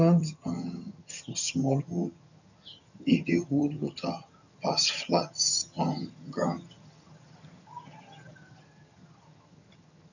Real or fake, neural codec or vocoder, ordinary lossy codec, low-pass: fake; vocoder, 22.05 kHz, 80 mel bands, HiFi-GAN; none; 7.2 kHz